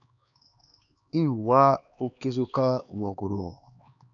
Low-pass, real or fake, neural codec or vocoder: 7.2 kHz; fake; codec, 16 kHz, 2 kbps, X-Codec, HuBERT features, trained on LibriSpeech